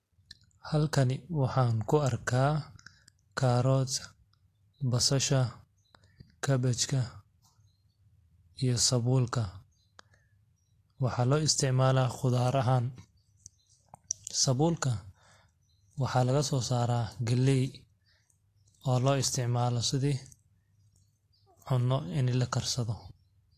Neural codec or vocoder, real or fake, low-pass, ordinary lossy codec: none; real; 14.4 kHz; AAC, 48 kbps